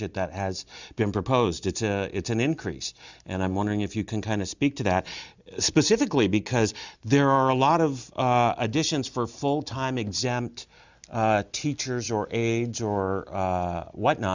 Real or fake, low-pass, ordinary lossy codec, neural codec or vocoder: real; 7.2 kHz; Opus, 64 kbps; none